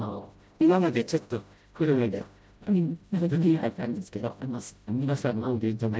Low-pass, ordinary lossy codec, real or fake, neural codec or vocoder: none; none; fake; codec, 16 kHz, 0.5 kbps, FreqCodec, smaller model